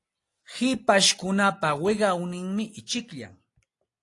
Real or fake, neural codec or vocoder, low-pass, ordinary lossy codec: real; none; 10.8 kHz; AAC, 48 kbps